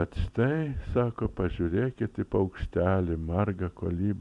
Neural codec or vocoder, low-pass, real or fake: vocoder, 44.1 kHz, 128 mel bands every 256 samples, BigVGAN v2; 10.8 kHz; fake